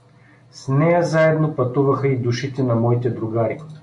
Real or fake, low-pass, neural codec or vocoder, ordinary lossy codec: real; 10.8 kHz; none; MP3, 64 kbps